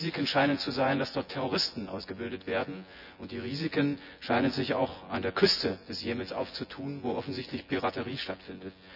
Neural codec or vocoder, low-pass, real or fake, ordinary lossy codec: vocoder, 24 kHz, 100 mel bands, Vocos; 5.4 kHz; fake; none